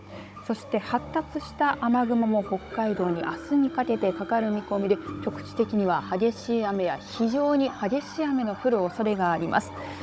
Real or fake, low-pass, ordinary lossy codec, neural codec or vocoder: fake; none; none; codec, 16 kHz, 16 kbps, FunCodec, trained on Chinese and English, 50 frames a second